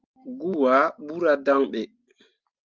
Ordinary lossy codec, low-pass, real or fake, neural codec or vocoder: Opus, 32 kbps; 7.2 kHz; real; none